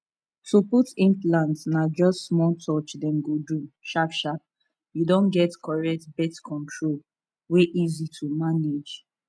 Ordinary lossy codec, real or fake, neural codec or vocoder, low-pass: none; real; none; none